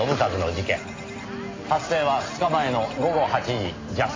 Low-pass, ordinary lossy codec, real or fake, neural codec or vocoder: 7.2 kHz; MP3, 32 kbps; real; none